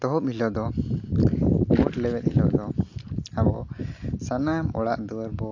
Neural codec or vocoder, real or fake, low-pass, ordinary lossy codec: none; real; 7.2 kHz; AAC, 48 kbps